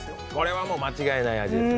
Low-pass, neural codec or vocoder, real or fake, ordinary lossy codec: none; none; real; none